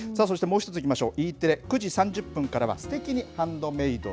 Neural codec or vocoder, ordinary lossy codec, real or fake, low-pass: none; none; real; none